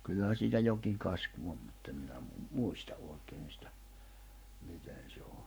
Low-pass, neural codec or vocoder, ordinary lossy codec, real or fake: none; codec, 44.1 kHz, 7.8 kbps, Pupu-Codec; none; fake